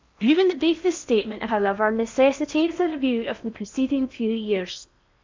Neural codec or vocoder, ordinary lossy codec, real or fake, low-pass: codec, 16 kHz in and 24 kHz out, 0.8 kbps, FocalCodec, streaming, 65536 codes; MP3, 64 kbps; fake; 7.2 kHz